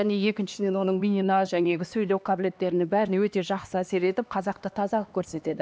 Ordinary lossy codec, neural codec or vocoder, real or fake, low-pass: none; codec, 16 kHz, 2 kbps, X-Codec, HuBERT features, trained on LibriSpeech; fake; none